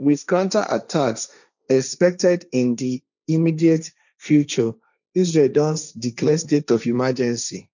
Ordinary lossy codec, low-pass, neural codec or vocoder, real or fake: none; 7.2 kHz; codec, 16 kHz, 1.1 kbps, Voila-Tokenizer; fake